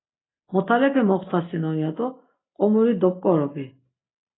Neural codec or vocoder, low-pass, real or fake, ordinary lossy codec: none; 7.2 kHz; real; AAC, 16 kbps